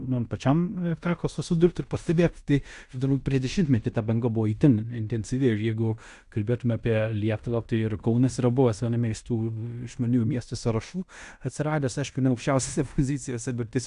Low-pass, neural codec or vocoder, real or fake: 10.8 kHz; codec, 16 kHz in and 24 kHz out, 0.9 kbps, LongCat-Audio-Codec, fine tuned four codebook decoder; fake